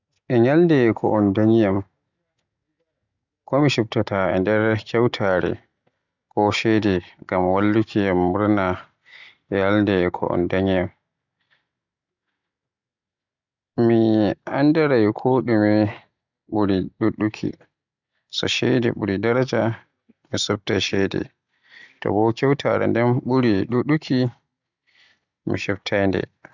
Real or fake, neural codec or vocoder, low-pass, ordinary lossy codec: real; none; 7.2 kHz; none